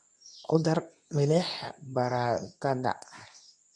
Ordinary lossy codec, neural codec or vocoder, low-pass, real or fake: none; codec, 24 kHz, 0.9 kbps, WavTokenizer, medium speech release version 2; none; fake